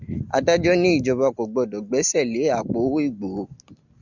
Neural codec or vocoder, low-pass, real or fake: none; 7.2 kHz; real